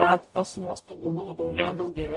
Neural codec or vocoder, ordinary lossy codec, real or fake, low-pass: codec, 44.1 kHz, 0.9 kbps, DAC; MP3, 48 kbps; fake; 10.8 kHz